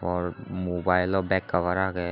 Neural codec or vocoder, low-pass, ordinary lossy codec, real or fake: none; 5.4 kHz; none; real